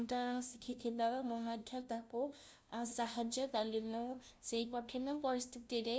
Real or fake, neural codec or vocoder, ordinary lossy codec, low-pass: fake; codec, 16 kHz, 0.5 kbps, FunCodec, trained on LibriTTS, 25 frames a second; none; none